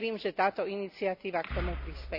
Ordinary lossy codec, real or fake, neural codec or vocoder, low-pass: none; real; none; 5.4 kHz